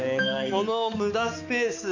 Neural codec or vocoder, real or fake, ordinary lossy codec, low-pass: codec, 16 kHz, 4 kbps, X-Codec, HuBERT features, trained on balanced general audio; fake; AAC, 48 kbps; 7.2 kHz